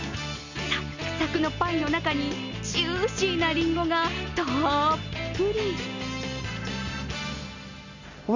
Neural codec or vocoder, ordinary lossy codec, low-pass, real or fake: none; none; 7.2 kHz; real